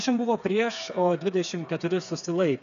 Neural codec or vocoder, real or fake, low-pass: codec, 16 kHz, 4 kbps, FreqCodec, smaller model; fake; 7.2 kHz